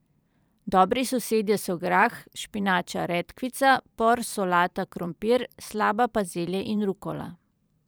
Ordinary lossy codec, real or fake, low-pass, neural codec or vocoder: none; fake; none; vocoder, 44.1 kHz, 128 mel bands every 512 samples, BigVGAN v2